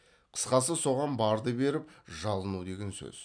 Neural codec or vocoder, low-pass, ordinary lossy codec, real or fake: none; 9.9 kHz; none; real